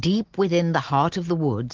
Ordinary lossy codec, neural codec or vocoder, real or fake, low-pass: Opus, 16 kbps; none; real; 7.2 kHz